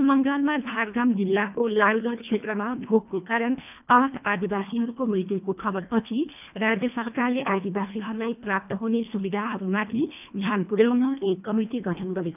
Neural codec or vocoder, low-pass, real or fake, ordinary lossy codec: codec, 24 kHz, 1.5 kbps, HILCodec; 3.6 kHz; fake; none